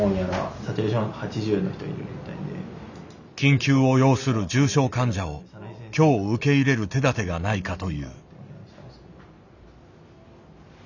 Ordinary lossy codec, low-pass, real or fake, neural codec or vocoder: none; 7.2 kHz; real; none